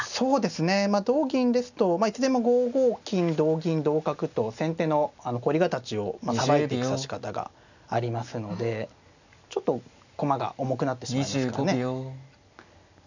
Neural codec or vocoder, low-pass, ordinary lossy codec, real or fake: none; 7.2 kHz; none; real